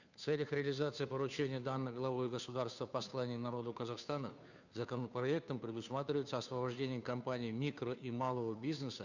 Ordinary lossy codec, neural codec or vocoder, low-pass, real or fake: none; codec, 16 kHz, 2 kbps, FunCodec, trained on Chinese and English, 25 frames a second; 7.2 kHz; fake